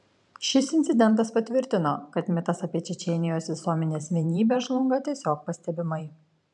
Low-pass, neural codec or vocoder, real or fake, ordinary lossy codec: 10.8 kHz; vocoder, 44.1 kHz, 128 mel bands every 512 samples, BigVGAN v2; fake; MP3, 96 kbps